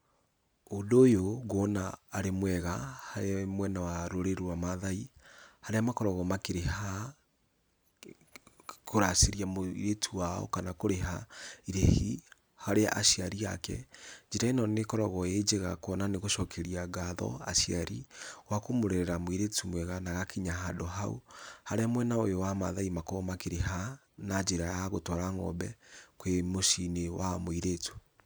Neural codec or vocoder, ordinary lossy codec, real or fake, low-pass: none; none; real; none